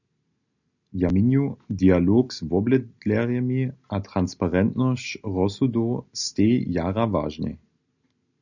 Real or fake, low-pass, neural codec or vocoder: real; 7.2 kHz; none